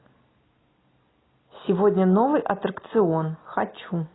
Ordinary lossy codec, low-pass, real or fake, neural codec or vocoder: AAC, 16 kbps; 7.2 kHz; real; none